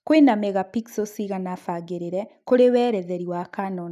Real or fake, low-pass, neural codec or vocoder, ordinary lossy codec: real; 14.4 kHz; none; none